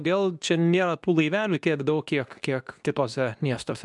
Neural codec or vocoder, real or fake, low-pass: codec, 24 kHz, 0.9 kbps, WavTokenizer, medium speech release version 2; fake; 10.8 kHz